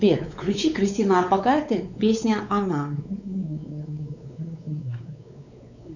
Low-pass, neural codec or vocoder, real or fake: 7.2 kHz; codec, 16 kHz, 4 kbps, X-Codec, WavLM features, trained on Multilingual LibriSpeech; fake